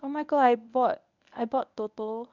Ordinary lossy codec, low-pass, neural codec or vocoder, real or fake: none; 7.2 kHz; codec, 24 kHz, 0.9 kbps, WavTokenizer, medium speech release version 1; fake